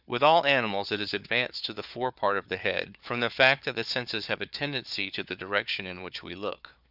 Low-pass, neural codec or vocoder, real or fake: 5.4 kHz; codec, 16 kHz, 4 kbps, FunCodec, trained on Chinese and English, 50 frames a second; fake